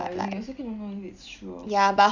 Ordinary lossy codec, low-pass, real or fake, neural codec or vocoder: none; 7.2 kHz; real; none